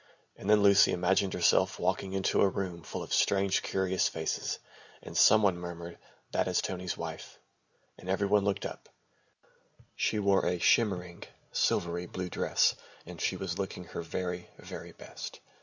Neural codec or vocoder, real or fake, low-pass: none; real; 7.2 kHz